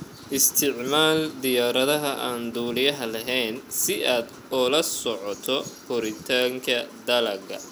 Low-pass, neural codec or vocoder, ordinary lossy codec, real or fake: none; none; none; real